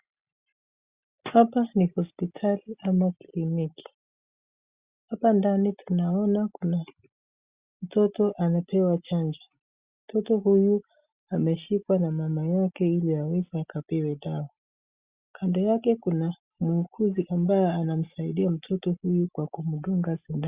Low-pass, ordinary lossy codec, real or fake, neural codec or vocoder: 3.6 kHz; Opus, 64 kbps; real; none